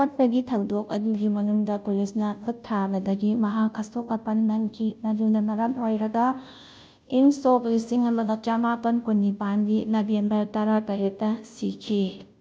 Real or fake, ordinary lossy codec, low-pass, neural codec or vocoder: fake; none; none; codec, 16 kHz, 0.5 kbps, FunCodec, trained on Chinese and English, 25 frames a second